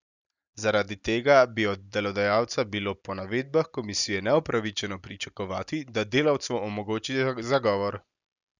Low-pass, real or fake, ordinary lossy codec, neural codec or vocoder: 7.2 kHz; real; none; none